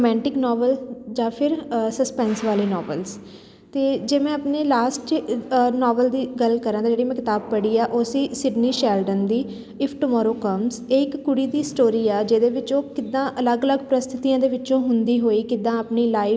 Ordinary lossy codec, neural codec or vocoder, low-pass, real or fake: none; none; none; real